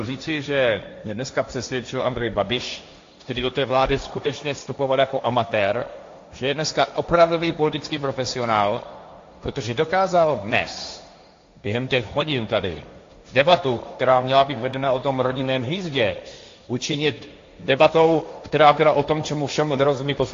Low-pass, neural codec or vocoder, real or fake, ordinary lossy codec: 7.2 kHz; codec, 16 kHz, 1.1 kbps, Voila-Tokenizer; fake; AAC, 48 kbps